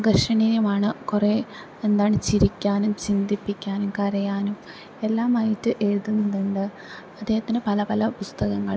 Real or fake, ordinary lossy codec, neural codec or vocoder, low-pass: real; none; none; none